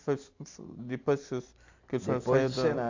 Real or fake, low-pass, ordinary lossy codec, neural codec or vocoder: real; 7.2 kHz; AAC, 48 kbps; none